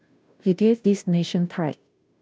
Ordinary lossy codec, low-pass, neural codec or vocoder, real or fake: none; none; codec, 16 kHz, 0.5 kbps, FunCodec, trained on Chinese and English, 25 frames a second; fake